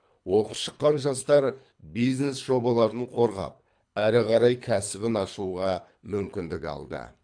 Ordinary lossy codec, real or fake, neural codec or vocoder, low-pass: AAC, 64 kbps; fake; codec, 24 kHz, 3 kbps, HILCodec; 9.9 kHz